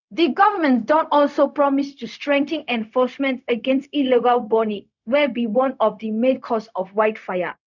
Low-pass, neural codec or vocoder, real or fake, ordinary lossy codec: 7.2 kHz; codec, 16 kHz, 0.4 kbps, LongCat-Audio-Codec; fake; none